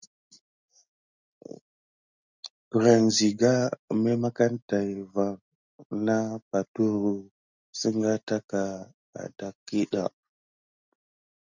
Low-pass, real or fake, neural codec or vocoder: 7.2 kHz; real; none